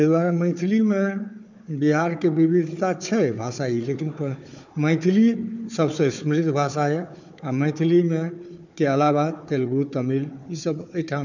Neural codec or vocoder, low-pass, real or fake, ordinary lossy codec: codec, 16 kHz, 4 kbps, FunCodec, trained on Chinese and English, 50 frames a second; 7.2 kHz; fake; none